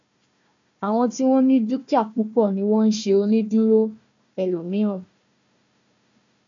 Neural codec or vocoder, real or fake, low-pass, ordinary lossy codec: codec, 16 kHz, 1 kbps, FunCodec, trained on Chinese and English, 50 frames a second; fake; 7.2 kHz; MP3, 64 kbps